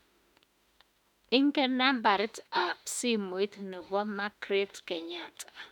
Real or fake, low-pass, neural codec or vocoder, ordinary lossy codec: fake; 19.8 kHz; autoencoder, 48 kHz, 32 numbers a frame, DAC-VAE, trained on Japanese speech; none